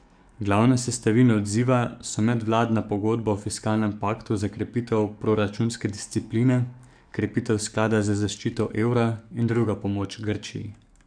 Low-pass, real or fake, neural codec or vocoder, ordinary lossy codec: 9.9 kHz; fake; codec, 44.1 kHz, 7.8 kbps, DAC; none